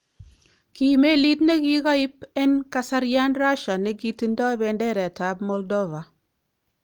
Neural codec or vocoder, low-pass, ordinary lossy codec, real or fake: none; 19.8 kHz; Opus, 32 kbps; real